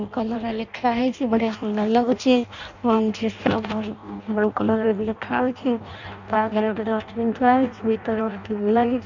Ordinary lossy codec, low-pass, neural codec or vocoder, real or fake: none; 7.2 kHz; codec, 16 kHz in and 24 kHz out, 0.6 kbps, FireRedTTS-2 codec; fake